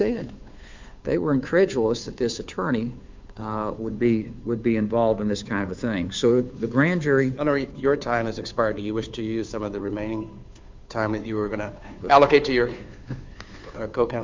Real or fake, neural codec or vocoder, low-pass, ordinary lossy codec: fake; codec, 16 kHz, 2 kbps, FunCodec, trained on Chinese and English, 25 frames a second; 7.2 kHz; MP3, 64 kbps